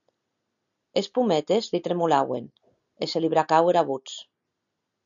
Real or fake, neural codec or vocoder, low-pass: real; none; 7.2 kHz